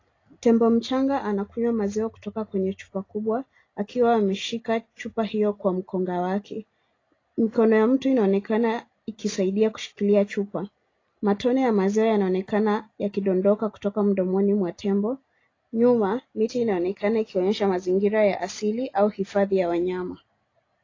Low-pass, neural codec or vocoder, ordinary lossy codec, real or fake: 7.2 kHz; none; AAC, 32 kbps; real